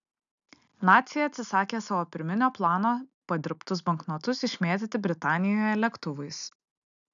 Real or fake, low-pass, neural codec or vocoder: real; 7.2 kHz; none